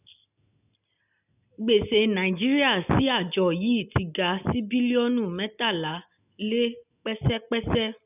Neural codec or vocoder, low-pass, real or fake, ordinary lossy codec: none; 3.6 kHz; real; none